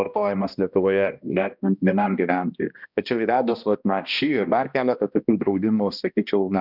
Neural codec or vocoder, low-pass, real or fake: codec, 16 kHz, 1 kbps, X-Codec, HuBERT features, trained on balanced general audio; 5.4 kHz; fake